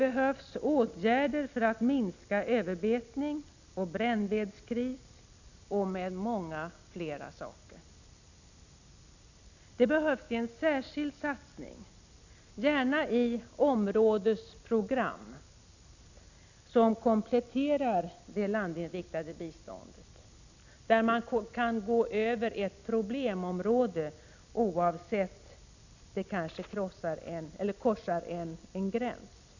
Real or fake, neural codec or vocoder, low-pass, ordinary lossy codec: real; none; 7.2 kHz; none